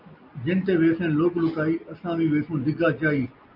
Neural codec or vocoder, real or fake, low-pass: none; real; 5.4 kHz